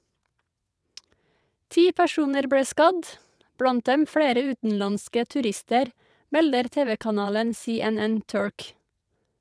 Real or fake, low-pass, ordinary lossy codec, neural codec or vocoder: fake; none; none; vocoder, 22.05 kHz, 80 mel bands, WaveNeXt